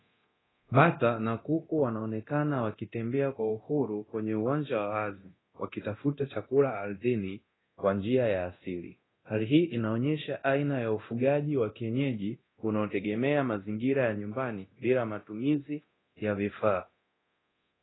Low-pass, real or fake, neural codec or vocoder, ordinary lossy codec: 7.2 kHz; fake; codec, 24 kHz, 0.9 kbps, DualCodec; AAC, 16 kbps